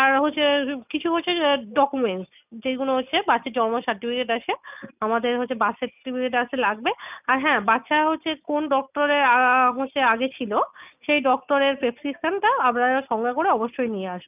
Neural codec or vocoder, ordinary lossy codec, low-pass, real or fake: none; none; 3.6 kHz; real